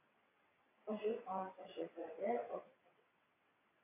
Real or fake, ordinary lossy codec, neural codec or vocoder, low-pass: fake; AAC, 16 kbps; vocoder, 22.05 kHz, 80 mel bands, WaveNeXt; 3.6 kHz